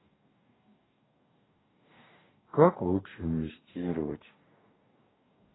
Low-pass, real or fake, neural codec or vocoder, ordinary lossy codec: 7.2 kHz; fake; codec, 44.1 kHz, 2.6 kbps, DAC; AAC, 16 kbps